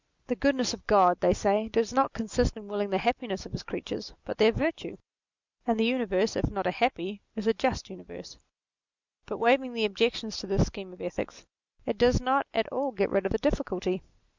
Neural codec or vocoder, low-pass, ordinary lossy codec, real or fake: none; 7.2 kHz; Opus, 64 kbps; real